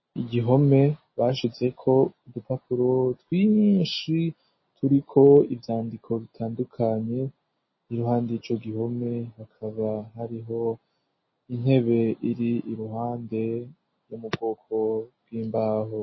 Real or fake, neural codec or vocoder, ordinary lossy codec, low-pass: real; none; MP3, 24 kbps; 7.2 kHz